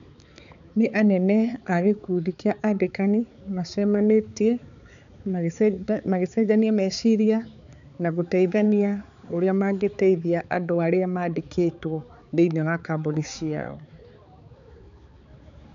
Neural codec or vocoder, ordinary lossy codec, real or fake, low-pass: codec, 16 kHz, 4 kbps, X-Codec, HuBERT features, trained on balanced general audio; none; fake; 7.2 kHz